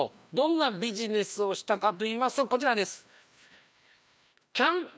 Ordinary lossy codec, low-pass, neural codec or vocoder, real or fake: none; none; codec, 16 kHz, 1 kbps, FreqCodec, larger model; fake